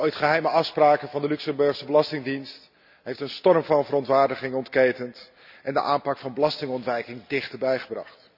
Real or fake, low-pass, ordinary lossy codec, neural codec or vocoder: real; 5.4 kHz; none; none